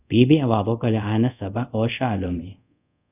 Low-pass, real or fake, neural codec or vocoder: 3.6 kHz; fake; codec, 24 kHz, 0.5 kbps, DualCodec